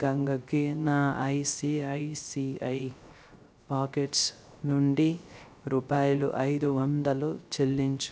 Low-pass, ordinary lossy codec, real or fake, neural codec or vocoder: none; none; fake; codec, 16 kHz, 0.3 kbps, FocalCodec